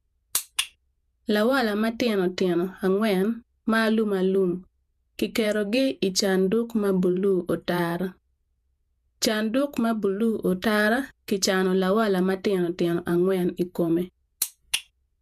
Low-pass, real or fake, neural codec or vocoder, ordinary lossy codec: 14.4 kHz; fake; vocoder, 48 kHz, 128 mel bands, Vocos; none